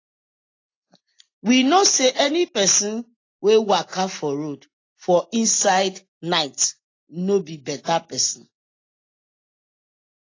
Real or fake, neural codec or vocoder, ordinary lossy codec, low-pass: real; none; AAC, 32 kbps; 7.2 kHz